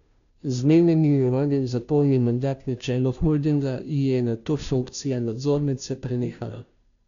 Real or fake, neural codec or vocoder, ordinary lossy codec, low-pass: fake; codec, 16 kHz, 0.5 kbps, FunCodec, trained on Chinese and English, 25 frames a second; none; 7.2 kHz